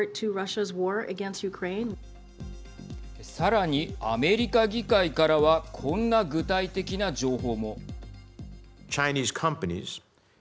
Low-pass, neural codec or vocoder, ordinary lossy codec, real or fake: none; none; none; real